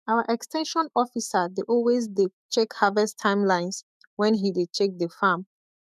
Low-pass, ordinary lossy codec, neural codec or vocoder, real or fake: 14.4 kHz; none; autoencoder, 48 kHz, 128 numbers a frame, DAC-VAE, trained on Japanese speech; fake